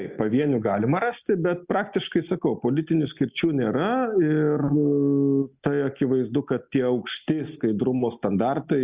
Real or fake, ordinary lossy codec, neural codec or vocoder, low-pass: real; Opus, 64 kbps; none; 3.6 kHz